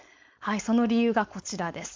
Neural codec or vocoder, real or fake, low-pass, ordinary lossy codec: codec, 16 kHz, 4.8 kbps, FACodec; fake; 7.2 kHz; MP3, 64 kbps